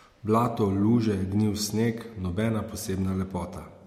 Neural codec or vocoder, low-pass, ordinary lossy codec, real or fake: none; 19.8 kHz; MP3, 64 kbps; real